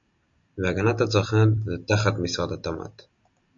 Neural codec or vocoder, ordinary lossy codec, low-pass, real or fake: none; MP3, 48 kbps; 7.2 kHz; real